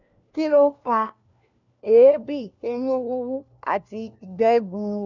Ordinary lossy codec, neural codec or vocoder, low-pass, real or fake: none; codec, 16 kHz, 1 kbps, FunCodec, trained on LibriTTS, 50 frames a second; 7.2 kHz; fake